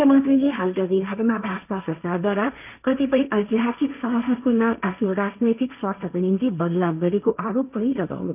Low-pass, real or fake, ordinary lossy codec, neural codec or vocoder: 3.6 kHz; fake; none; codec, 16 kHz, 1.1 kbps, Voila-Tokenizer